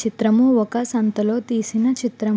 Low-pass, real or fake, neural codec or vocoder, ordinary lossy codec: none; real; none; none